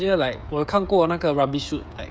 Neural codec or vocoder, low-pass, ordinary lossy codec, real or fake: codec, 16 kHz, 16 kbps, FreqCodec, smaller model; none; none; fake